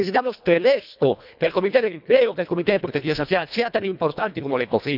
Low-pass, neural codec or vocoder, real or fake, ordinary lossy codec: 5.4 kHz; codec, 24 kHz, 1.5 kbps, HILCodec; fake; none